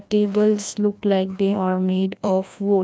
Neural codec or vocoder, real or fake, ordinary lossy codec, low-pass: codec, 16 kHz, 1 kbps, FreqCodec, larger model; fake; none; none